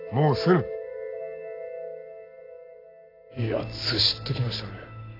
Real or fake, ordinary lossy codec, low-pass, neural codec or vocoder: real; AAC, 24 kbps; 5.4 kHz; none